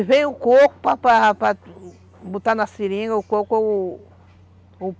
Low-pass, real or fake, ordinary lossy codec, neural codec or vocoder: none; real; none; none